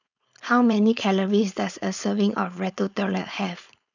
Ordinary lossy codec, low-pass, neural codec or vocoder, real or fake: none; 7.2 kHz; codec, 16 kHz, 4.8 kbps, FACodec; fake